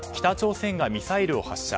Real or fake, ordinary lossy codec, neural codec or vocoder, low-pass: real; none; none; none